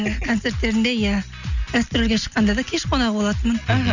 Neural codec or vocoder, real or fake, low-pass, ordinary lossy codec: none; real; 7.2 kHz; none